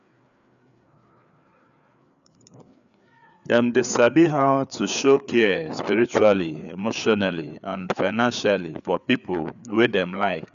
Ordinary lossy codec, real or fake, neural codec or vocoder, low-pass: none; fake; codec, 16 kHz, 4 kbps, FreqCodec, larger model; 7.2 kHz